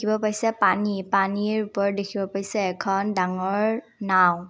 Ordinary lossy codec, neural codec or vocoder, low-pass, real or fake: none; none; none; real